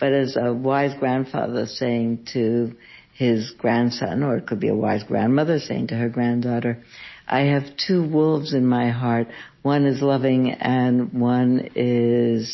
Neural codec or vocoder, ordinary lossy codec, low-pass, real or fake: none; MP3, 24 kbps; 7.2 kHz; real